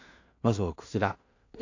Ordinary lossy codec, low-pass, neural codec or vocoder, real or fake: AAC, 48 kbps; 7.2 kHz; codec, 16 kHz in and 24 kHz out, 0.4 kbps, LongCat-Audio-Codec, two codebook decoder; fake